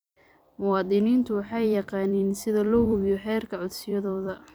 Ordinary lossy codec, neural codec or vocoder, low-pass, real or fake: none; vocoder, 44.1 kHz, 128 mel bands every 256 samples, BigVGAN v2; none; fake